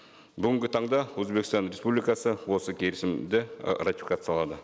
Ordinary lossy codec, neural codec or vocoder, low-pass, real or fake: none; none; none; real